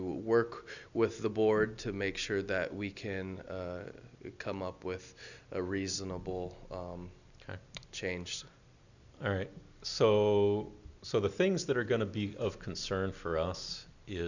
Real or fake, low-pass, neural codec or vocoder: real; 7.2 kHz; none